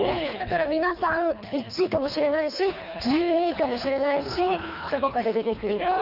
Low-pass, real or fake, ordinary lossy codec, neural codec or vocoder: 5.4 kHz; fake; none; codec, 24 kHz, 3 kbps, HILCodec